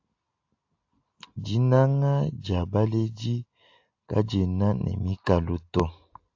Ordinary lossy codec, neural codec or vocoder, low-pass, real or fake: AAC, 48 kbps; none; 7.2 kHz; real